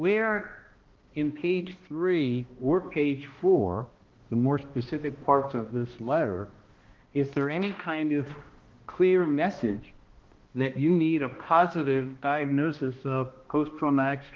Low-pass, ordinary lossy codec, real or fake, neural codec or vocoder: 7.2 kHz; Opus, 16 kbps; fake; codec, 16 kHz, 1 kbps, X-Codec, HuBERT features, trained on balanced general audio